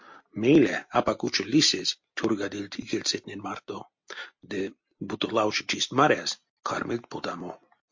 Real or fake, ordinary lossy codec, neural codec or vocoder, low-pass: real; MP3, 48 kbps; none; 7.2 kHz